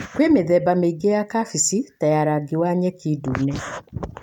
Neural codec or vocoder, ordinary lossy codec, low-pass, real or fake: none; none; 19.8 kHz; real